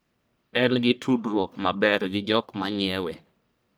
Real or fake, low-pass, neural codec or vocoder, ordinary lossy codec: fake; none; codec, 44.1 kHz, 1.7 kbps, Pupu-Codec; none